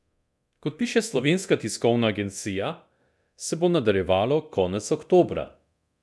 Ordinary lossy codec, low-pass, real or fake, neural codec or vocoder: none; none; fake; codec, 24 kHz, 0.9 kbps, DualCodec